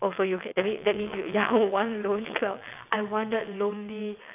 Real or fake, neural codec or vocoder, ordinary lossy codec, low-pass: fake; vocoder, 22.05 kHz, 80 mel bands, WaveNeXt; none; 3.6 kHz